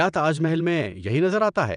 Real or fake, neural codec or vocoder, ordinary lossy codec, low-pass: fake; vocoder, 22.05 kHz, 80 mel bands, WaveNeXt; none; 9.9 kHz